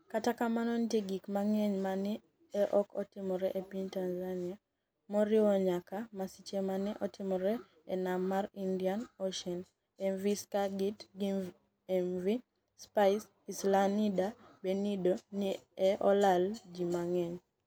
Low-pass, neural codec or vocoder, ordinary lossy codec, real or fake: none; none; none; real